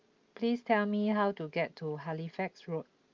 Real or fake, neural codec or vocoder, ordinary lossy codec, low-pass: real; none; Opus, 24 kbps; 7.2 kHz